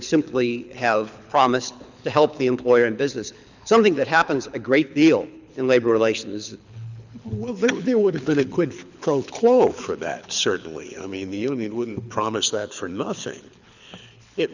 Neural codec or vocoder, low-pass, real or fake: codec, 24 kHz, 6 kbps, HILCodec; 7.2 kHz; fake